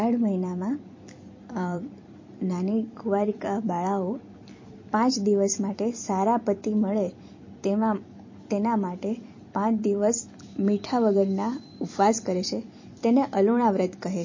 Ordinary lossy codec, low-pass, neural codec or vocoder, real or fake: MP3, 32 kbps; 7.2 kHz; vocoder, 44.1 kHz, 128 mel bands every 256 samples, BigVGAN v2; fake